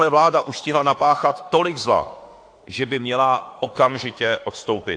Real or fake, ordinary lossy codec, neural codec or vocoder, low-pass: fake; AAC, 48 kbps; autoencoder, 48 kHz, 32 numbers a frame, DAC-VAE, trained on Japanese speech; 9.9 kHz